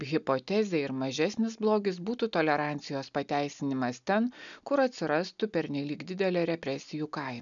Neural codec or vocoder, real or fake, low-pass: none; real; 7.2 kHz